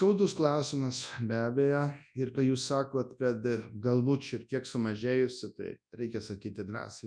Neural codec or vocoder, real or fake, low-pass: codec, 24 kHz, 0.9 kbps, WavTokenizer, large speech release; fake; 9.9 kHz